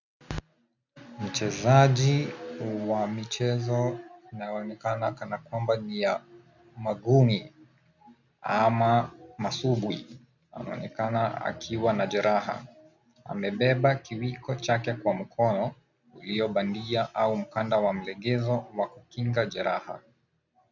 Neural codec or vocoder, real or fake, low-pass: none; real; 7.2 kHz